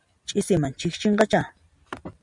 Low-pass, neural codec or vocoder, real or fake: 10.8 kHz; none; real